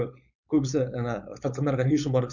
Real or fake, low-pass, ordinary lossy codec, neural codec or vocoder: fake; 7.2 kHz; none; codec, 16 kHz, 4.8 kbps, FACodec